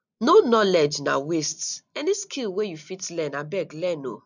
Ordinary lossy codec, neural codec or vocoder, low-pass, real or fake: none; none; 7.2 kHz; real